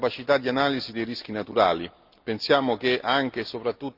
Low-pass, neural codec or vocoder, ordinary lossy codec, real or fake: 5.4 kHz; none; Opus, 32 kbps; real